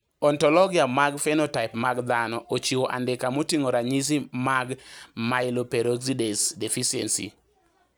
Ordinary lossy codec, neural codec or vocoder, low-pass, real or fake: none; none; none; real